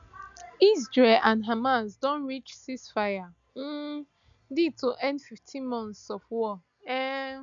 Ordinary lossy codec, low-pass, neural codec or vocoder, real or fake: none; 7.2 kHz; none; real